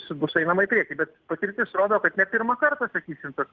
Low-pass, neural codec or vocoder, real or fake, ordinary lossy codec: 7.2 kHz; none; real; Opus, 16 kbps